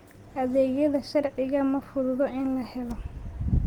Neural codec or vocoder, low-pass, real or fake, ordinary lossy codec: none; 19.8 kHz; real; Opus, 24 kbps